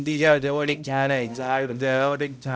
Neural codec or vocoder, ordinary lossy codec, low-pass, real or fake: codec, 16 kHz, 0.5 kbps, X-Codec, HuBERT features, trained on balanced general audio; none; none; fake